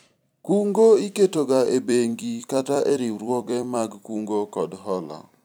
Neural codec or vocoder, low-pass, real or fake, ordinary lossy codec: vocoder, 44.1 kHz, 128 mel bands every 256 samples, BigVGAN v2; none; fake; none